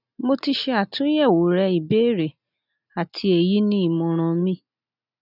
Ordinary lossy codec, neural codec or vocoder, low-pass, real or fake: none; none; 5.4 kHz; real